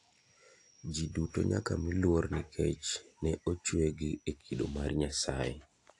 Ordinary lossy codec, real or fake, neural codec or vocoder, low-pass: AAC, 48 kbps; real; none; 10.8 kHz